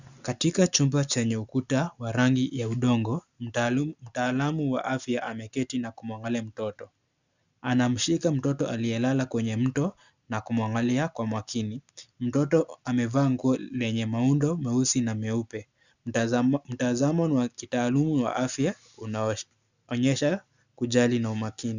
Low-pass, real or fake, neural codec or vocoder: 7.2 kHz; real; none